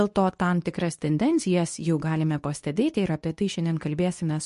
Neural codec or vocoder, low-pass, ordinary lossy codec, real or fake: codec, 24 kHz, 0.9 kbps, WavTokenizer, medium speech release version 2; 10.8 kHz; MP3, 48 kbps; fake